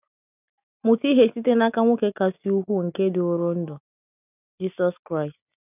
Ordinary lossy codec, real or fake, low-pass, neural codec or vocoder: none; real; 3.6 kHz; none